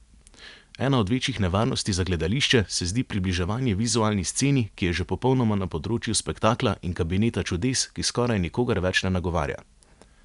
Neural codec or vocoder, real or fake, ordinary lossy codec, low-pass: none; real; none; 10.8 kHz